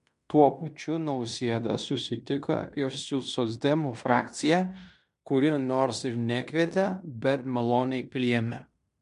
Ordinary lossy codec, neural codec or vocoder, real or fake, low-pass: MP3, 64 kbps; codec, 16 kHz in and 24 kHz out, 0.9 kbps, LongCat-Audio-Codec, fine tuned four codebook decoder; fake; 10.8 kHz